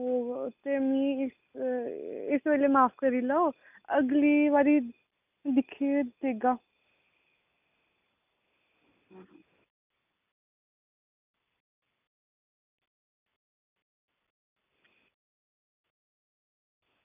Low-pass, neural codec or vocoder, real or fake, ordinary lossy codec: 3.6 kHz; none; real; none